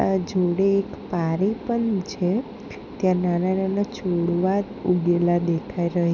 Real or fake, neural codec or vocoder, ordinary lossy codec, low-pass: real; none; none; 7.2 kHz